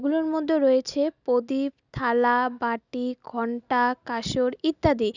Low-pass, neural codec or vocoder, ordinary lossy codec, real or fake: 7.2 kHz; none; none; real